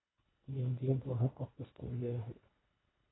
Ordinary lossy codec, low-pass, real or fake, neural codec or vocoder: AAC, 16 kbps; 7.2 kHz; fake; codec, 24 kHz, 1.5 kbps, HILCodec